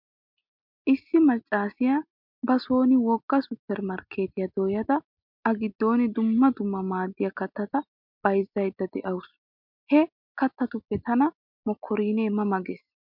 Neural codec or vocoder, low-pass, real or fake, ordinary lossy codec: none; 5.4 kHz; real; MP3, 48 kbps